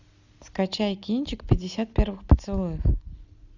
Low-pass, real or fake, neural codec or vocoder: 7.2 kHz; real; none